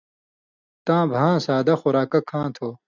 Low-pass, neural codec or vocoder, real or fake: 7.2 kHz; none; real